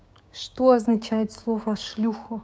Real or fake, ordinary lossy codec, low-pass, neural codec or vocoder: fake; none; none; codec, 16 kHz, 6 kbps, DAC